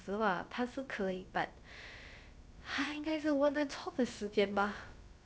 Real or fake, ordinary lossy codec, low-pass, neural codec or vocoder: fake; none; none; codec, 16 kHz, about 1 kbps, DyCAST, with the encoder's durations